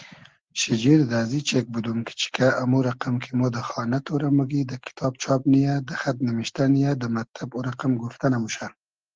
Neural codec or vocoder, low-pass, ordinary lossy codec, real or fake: none; 7.2 kHz; Opus, 16 kbps; real